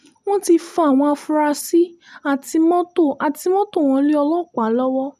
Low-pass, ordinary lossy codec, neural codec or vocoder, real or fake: 14.4 kHz; none; none; real